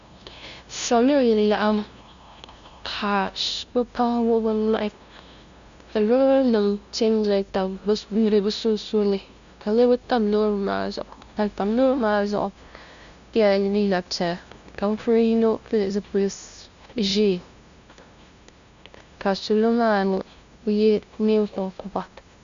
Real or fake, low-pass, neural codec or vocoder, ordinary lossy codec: fake; 7.2 kHz; codec, 16 kHz, 0.5 kbps, FunCodec, trained on LibriTTS, 25 frames a second; Opus, 64 kbps